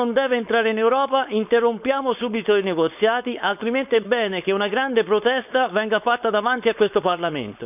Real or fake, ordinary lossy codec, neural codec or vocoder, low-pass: fake; none; codec, 16 kHz, 4.8 kbps, FACodec; 3.6 kHz